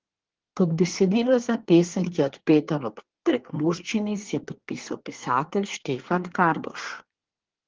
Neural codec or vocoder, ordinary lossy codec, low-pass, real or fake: codec, 24 kHz, 1 kbps, SNAC; Opus, 16 kbps; 7.2 kHz; fake